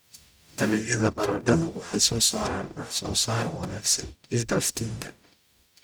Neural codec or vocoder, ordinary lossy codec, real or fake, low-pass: codec, 44.1 kHz, 0.9 kbps, DAC; none; fake; none